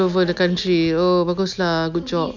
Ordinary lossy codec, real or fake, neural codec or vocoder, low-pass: none; real; none; 7.2 kHz